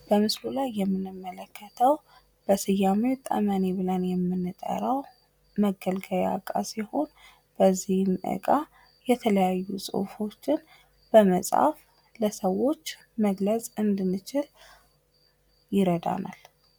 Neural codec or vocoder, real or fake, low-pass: none; real; 19.8 kHz